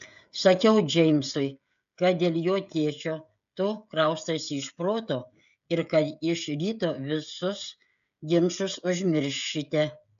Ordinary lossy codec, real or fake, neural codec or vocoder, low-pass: AAC, 96 kbps; fake; codec, 16 kHz, 16 kbps, FreqCodec, smaller model; 7.2 kHz